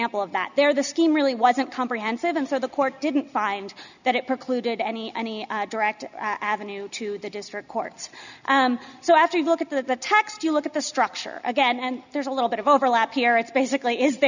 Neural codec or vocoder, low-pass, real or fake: none; 7.2 kHz; real